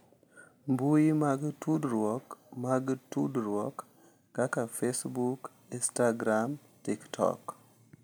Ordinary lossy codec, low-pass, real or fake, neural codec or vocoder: none; none; real; none